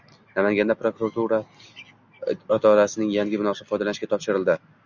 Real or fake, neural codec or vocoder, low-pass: real; none; 7.2 kHz